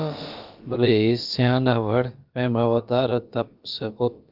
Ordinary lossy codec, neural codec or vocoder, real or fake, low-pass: Opus, 32 kbps; codec, 16 kHz, about 1 kbps, DyCAST, with the encoder's durations; fake; 5.4 kHz